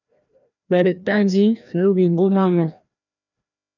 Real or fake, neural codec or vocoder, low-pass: fake; codec, 16 kHz, 1 kbps, FreqCodec, larger model; 7.2 kHz